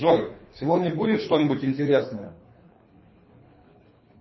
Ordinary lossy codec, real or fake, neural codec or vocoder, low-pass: MP3, 24 kbps; fake; codec, 24 kHz, 3 kbps, HILCodec; 7.2 kHz